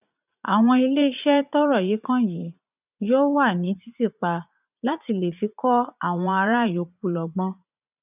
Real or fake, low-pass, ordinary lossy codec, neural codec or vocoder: fake; 3.6 kHz; none; vocoder, 44.1 kHz, 80 mel bands, Vocos